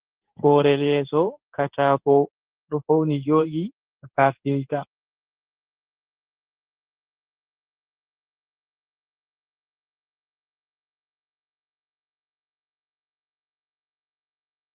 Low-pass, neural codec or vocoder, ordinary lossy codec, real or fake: 3.6 kHz; codec, 16 kHz in and 24 kHz out, 1 kbps, XY-Tokenizer; Opus, 16 kbps; fake